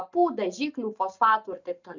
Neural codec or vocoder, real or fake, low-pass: none; real; 7.2 kHz